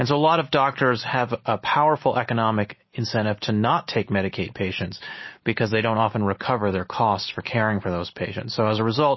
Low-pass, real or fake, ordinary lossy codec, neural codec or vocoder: 7.2 kHz; real; MP3, 24 kbps; none